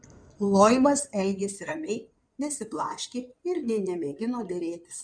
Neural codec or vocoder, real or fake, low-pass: codec, 16 kHz in and 24 kHz out, 2.2 kbps, FireRedTTS-2 codec; fake; 9.9 kHz